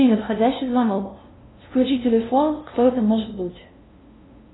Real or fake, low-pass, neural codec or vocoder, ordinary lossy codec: fake; 7.2 kHz; codec, 16 kHz, 0.5 kbps, FunCodec, trained on LibriTTS, 25 frames a second; AAC, 16 kbps